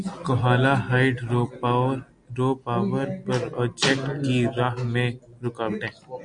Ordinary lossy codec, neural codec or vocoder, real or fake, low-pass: MP3, 96 kbps; none; real; 9.9 kHz